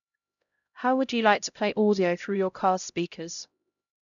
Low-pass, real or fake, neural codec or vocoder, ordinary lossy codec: 7.2 kHz; fake; codec, 16 kHz, 0.5 kbps, X-Codec, HuBERT features, trained on LibriSpeech; none